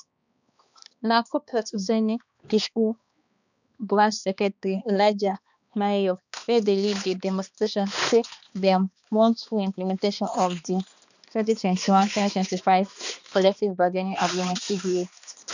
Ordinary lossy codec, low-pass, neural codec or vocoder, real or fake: none; 7.2 kHz; codec, 16 kHz, 2 kbps, X-Codec, HuBERT features, trained on balanced general audio; fake